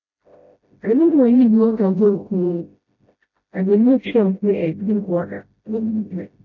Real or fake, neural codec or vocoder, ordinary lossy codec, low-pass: fake; codec, 16 kHz, 0.5 kbps, FreqCodec, smaller model; none; 7.2 kHz